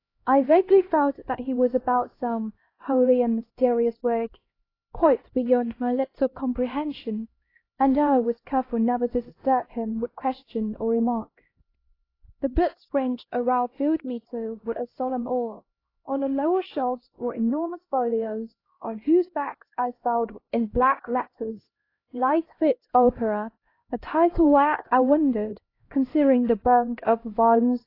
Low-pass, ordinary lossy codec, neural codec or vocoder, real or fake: 5.4 kHz; AAC, 24 kbps; codec, 16 kHz, 1 kbps, X-Codec, HuBERT features, trained on LibriSpeech; fake